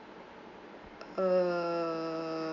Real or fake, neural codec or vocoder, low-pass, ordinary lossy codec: real; none; 7.2 kHz; AAC, 48 kbps